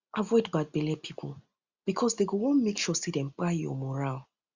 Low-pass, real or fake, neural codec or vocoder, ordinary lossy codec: 7.2 kHz; real; none; Opus, 64 kbps